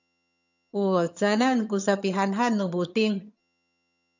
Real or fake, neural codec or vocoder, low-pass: fake; vocoder, 22.05 kHz, 80 mel bands, HiFi-GAN; 7.2 kHz